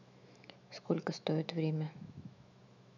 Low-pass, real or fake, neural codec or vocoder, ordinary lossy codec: 7.2 kHz; fake; autoencoder, 48 kHz, 128 numbers a frame, DAC-VAE, trained on Japanese speech; none